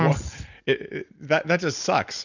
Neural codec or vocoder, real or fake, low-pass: none; real; 7.2 kHz